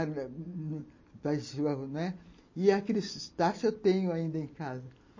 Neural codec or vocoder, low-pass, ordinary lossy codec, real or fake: none; 7.2 kHz; MP3, 32 kbps; real